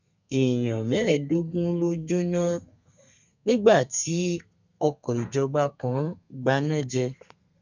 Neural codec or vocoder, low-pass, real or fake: codec, 32 kHz, 1.9 kbps, SNAC; 7.2 kHz; fake